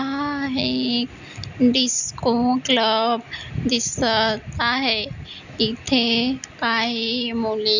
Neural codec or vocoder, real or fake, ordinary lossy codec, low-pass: none; real; none; 7.2 kHz